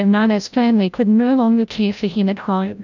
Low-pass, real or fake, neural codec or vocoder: 7.2 kHz; fake; codec, 16 kHz, 0.5 kbps, FreqCodec, larger model